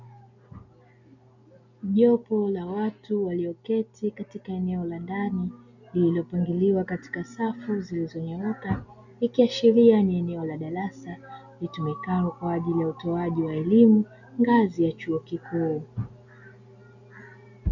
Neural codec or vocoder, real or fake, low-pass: none; real; 7.2 kHz